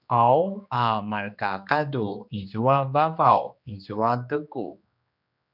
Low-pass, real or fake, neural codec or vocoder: 5.4 kHz; fake; codec, 16 kHz, 2 kbps, X-Codec, HuBERT features, trained on general audio